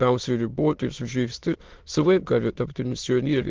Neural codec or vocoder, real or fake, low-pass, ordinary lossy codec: autoencoder, 22.05 kHz, a latent of 192 numbers a frame, VITS, trained on many speakers; fake; 7.2 kHz; Opus, 16 kbps